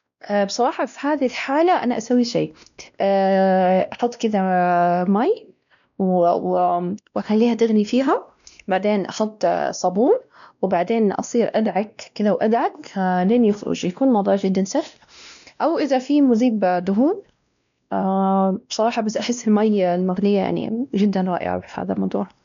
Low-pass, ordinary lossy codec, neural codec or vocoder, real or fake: 7.2 kHz; none; codec, 16 kHz, 1 kbps, X-Codec, WavLM features, trained on Multilingual LibriSpeech; fake